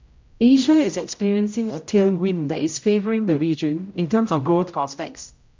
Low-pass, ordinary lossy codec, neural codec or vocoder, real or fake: 7.2 kHz; MP3, 64 kbps; codec, 16 kHz, 0.5 kbps, X-Codec, HuBERT features, trained on general audio; fake